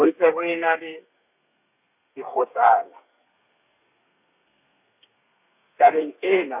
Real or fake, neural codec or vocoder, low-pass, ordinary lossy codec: fake; codec, 32 kHz, 1.9 kbps, SNAC; 3.6 kHz; none